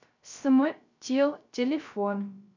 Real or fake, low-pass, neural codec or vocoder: fake; 7.2 kHz; codec, 16 kHz, 0.3 kbps, FocalCodec